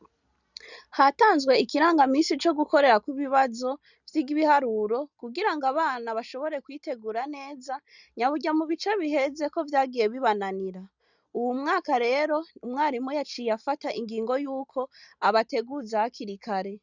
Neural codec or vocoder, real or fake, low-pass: none; real; 7.2 kHz